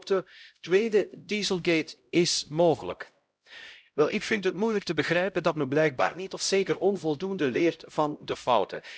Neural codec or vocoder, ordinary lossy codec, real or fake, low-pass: codec, 16 kHz, 0.5 kbps, X-Codec, HuBERT features, trained on LibriSpeech; none; fake; none